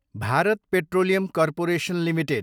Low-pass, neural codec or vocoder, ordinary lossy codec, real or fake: 19.8 kHz; none; none; real